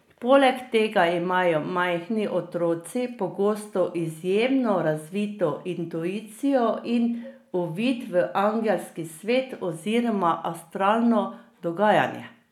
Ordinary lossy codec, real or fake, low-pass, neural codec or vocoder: none; real; 19.8 kHz; none